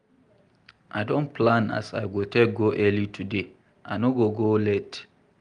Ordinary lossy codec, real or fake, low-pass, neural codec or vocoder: Opus, 32 kbps; real; 9.9 kHz; none